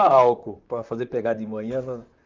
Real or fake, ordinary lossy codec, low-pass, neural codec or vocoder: fake; Opus, 24 kbps; 7.2 kHz; vocoder, 44.1 kHz, 128 mel bands, Pupu-Vocoder